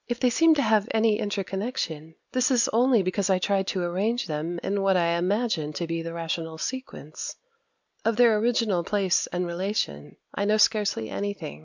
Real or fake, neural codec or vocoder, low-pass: real; none; 7.2 kHz